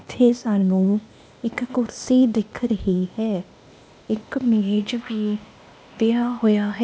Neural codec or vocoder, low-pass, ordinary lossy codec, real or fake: codec, 16 kHz, 0.8 kbps, ZipCodec; none; none; fake